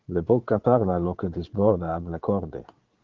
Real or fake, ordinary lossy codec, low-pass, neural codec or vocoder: fake; Opus, 16 kbps; 7.2 kHz; vocoder, 22.05 kHz, 80 mel bands, Vocos